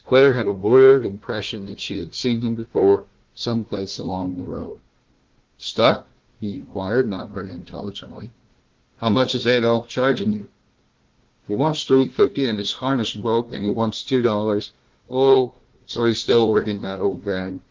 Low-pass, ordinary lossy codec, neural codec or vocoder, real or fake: 7.2 kHz; Opus, 16 kbps; codec, 16 kHz, 1 kbps, FunCodec, trained on Chinese and English, 50 frames a second; fake